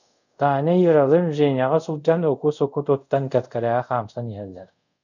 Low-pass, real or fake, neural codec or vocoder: 7.2 kHz; fake; codec, 24 kHz, 0.5 kbps, DualCodec